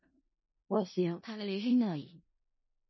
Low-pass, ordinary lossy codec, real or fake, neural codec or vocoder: 7.2 kHz; MP3, 24 kbps; fake; codec, 16 kHz in and 24 kHz out, 0.4 kbps, LongCat-Audio-Codec, four codebook decoder